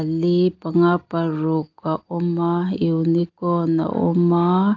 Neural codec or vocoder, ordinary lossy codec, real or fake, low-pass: none; Opus, 32 kbps; real; 7.2 kHz